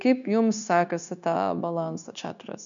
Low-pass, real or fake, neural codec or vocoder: 7.2 kHz; fake; codec, 16 kHz, 0.9 kbps, LongCat-Audio-Codec